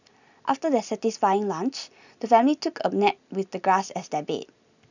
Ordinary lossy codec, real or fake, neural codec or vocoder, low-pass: none; real; none; 7.2 kHz